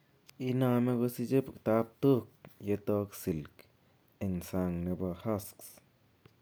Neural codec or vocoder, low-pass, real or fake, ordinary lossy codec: none; none; real; none